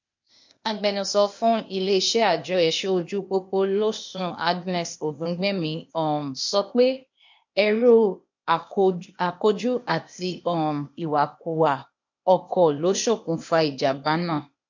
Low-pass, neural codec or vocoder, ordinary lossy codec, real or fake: 7.2 kHz; codec, 16 kHz, 0.8 kbps, ZipCodec; MP3, 48 kbps; fake